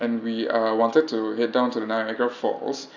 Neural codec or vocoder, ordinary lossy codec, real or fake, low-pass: none; none; real; 7.2 kHz